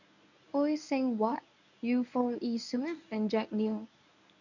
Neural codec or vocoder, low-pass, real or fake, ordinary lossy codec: codec, 24 kHz, 0.9 kbps, WavTokenizer, medium speech release version 1; 7.2 kHz; fake; none